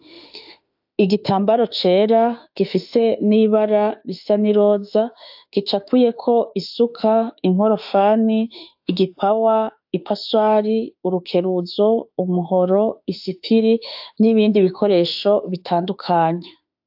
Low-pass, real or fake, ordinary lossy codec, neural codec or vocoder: 5.4 kHz; fake; AAC, 48 kbps; autoencoder, 48 kHz, 32 numbers a frame, DAC-VAE, trained on Japanese speech